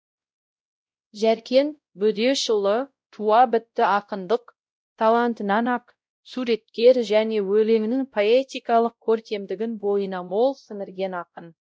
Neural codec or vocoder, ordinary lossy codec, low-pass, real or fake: codec, 16 kHz, 0.5 kbps, X-Codec, WavLM features, trained on Multilingual LibriSpeech; none; none; fake